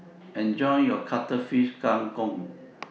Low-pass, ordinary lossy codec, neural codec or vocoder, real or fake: none; none; none; real